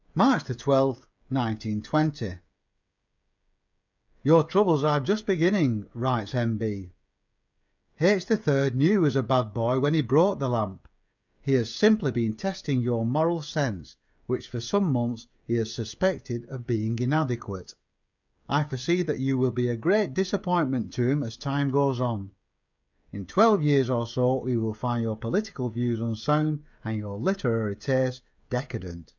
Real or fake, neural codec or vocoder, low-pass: fake; codec, 16 kHz, 16 kbps, FreqCodec, smaller model; 7.2 kHz